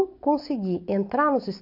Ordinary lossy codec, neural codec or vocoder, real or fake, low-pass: none; none; real; 5.4 kHz